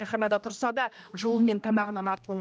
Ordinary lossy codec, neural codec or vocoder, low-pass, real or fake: none; codec, 16 kHz, 1 kbps, X-Codec, HuBERT features, trained on general audio; none; fake